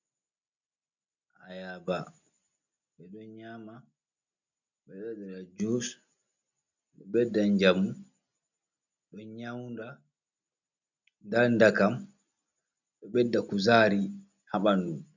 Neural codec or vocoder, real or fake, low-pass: none; real; 7.2 kHz